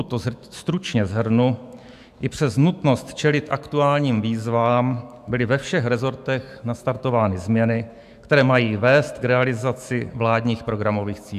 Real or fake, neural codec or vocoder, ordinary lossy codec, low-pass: fake; autoencoder, 48 kHz, 128 numbers a frame, DAC-VAE, trained on Japanese speech; AAC, 96 kbps; 14.4 kHz